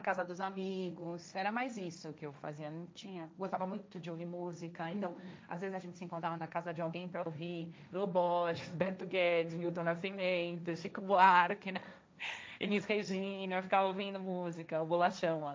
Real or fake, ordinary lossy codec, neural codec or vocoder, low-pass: fake; none; codec, 16 kHz, 1.1 kbps, Voila-Tokenizer; none